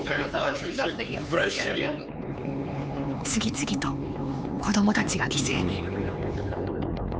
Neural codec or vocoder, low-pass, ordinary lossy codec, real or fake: codec, 16 kHz, 4 kbps, X-Codec, HuBERT features, trained on LibriSpeech; none; none; fake